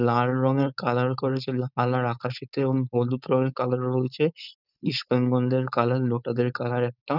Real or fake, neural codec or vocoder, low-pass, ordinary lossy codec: fake; codec, 16 kHz, 4.8 kbps, FACodec; 5.4 kHz; none